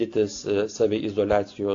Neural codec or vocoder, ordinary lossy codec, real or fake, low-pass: none; AAC, 48 kbps; real; 7.2 kHz